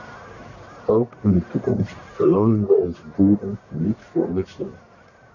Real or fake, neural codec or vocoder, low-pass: fake; codec, 44.1 kHz, 1.7 kbps, Pupu-Codec; 7.2 kHz